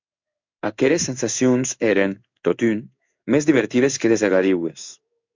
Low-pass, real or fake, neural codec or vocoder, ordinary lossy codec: 7.2 kHz; fake; codec, 16 kHz in and 24 kHz out, 1 kbps, XY-Tokenizer; MP3, 64 kbps